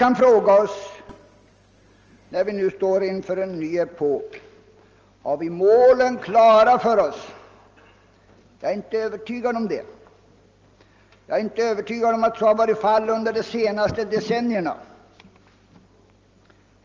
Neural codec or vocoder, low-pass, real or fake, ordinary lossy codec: none; 7.2 kHz; real; Opus, 24 kbps